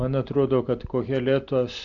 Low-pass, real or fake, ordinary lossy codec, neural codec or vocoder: 7.2 kHz; real; MP3, 64 kbps; none